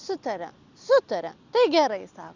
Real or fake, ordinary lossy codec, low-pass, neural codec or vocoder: real; Opus, 64 kbps; 7.2 kHz; none